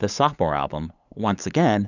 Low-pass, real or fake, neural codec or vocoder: 7.2 kHz; fake; codec, 16 kHz, 8 kbps, FunCodec, trained on LibriTTS, 25 frames a second